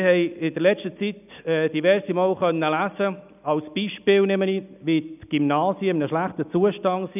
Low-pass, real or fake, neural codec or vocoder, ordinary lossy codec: 3.6 kHz; real; none; none